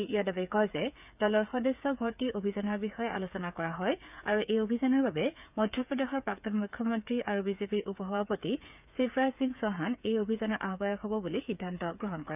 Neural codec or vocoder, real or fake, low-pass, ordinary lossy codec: codec, 16 kHz, 8 kbps, FreqCodec, smaller model; fake; 3.6 kHz; none